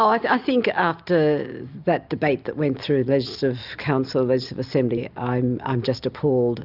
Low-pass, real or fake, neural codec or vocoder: 5.4 kHz; real; none